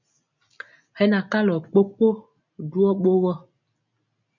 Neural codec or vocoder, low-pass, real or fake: none; 7.2 kHz; real